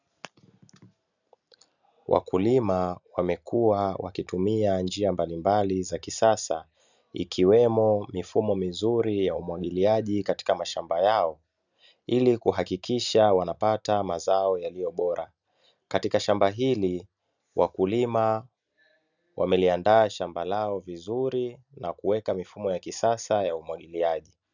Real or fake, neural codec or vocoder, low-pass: real; none; 7.2 kHz